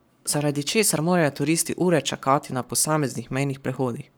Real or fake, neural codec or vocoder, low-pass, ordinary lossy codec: fake; codec, 44.1 kHz, 7.8 kbps, Pupu-Codec; none; none